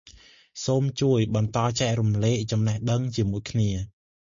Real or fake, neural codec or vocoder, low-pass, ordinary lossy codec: real; none; 7.2 kHz; MP3, 48 kbps